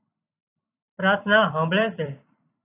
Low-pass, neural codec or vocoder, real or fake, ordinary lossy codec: 3.6 kHz; vocoder, 44.1 kHz, 128 mel bands every 256 samples, BigVGAN v2; fake; AAC, 24 kbps